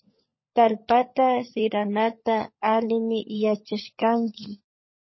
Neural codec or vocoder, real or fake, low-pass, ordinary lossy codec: codec, 16 kHz, 16 kbps, FunCodec, trained on LibriTTS, 50 frames a second; fake; 7.2 kHz; MP3, 24 kbps